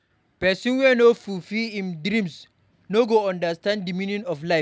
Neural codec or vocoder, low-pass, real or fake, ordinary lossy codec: none; none; real; none